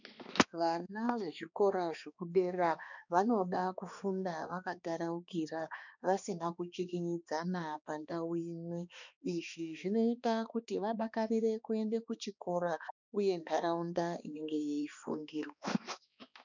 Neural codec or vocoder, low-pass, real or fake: codec, 16 kHz, 2 kbps, X-Codec, HuBERT features, trained on balanced general audio; 7.2 kHz; fake